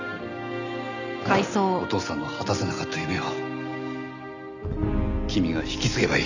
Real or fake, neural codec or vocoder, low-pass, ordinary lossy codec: real; none; 7.2 kHz; none